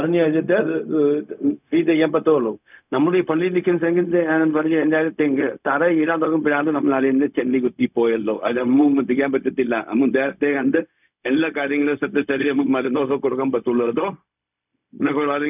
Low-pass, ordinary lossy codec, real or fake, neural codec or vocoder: 3.6 kHz; none; fake; codec, 16 kHz, 0.4 kbps, LongCat-Audio-Codec